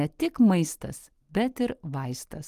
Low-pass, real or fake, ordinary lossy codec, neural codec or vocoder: 14.4 kHz; real; Opus, 24 kbps; none